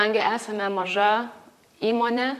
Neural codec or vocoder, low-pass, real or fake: vocoder, 44.1 kHz, 128 mel bands, Pupu-Vocoder; 14.4 kHz; fake